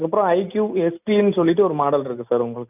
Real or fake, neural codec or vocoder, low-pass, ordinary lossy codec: real; none; 3.6 kHz; none